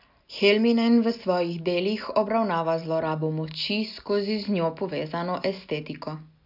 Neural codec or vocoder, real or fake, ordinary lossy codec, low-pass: none; real; none; 5.4 kHz